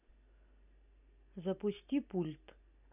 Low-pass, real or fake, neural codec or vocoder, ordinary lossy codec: 3.6 kHz; real; none; none